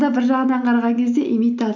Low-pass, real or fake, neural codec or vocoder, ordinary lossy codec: 7.2 kHz; real; none; none